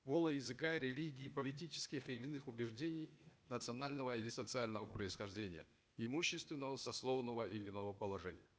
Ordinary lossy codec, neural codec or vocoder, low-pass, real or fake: none; codec, 16 kHz, 0.8 kbps, ZipCodec; none; fake